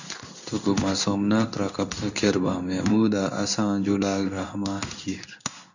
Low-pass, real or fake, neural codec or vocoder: 7.2 kHz; fake; codec, 16 kHz in and 24 kHz out, 1 kbps, XY-Tokenizer